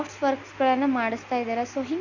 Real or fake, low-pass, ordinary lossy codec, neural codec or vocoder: real; 7.2 kHz; none; none